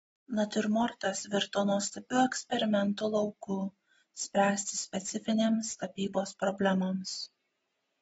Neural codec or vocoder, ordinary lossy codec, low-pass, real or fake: none; AAC, 24 kbps; 19.8 kHz; real